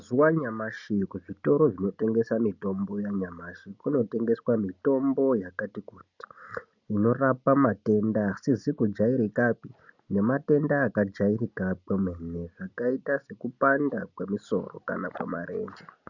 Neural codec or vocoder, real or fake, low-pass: none; real; 7.2 kHz